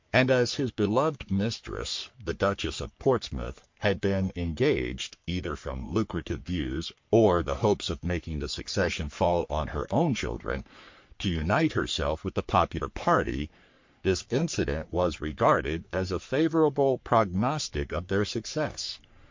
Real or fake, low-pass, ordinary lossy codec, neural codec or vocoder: fake; 7.2 kHz; MP3, 48 kbps; codec, 44.1 kHz, 3.4 kbps, Pupu-Codec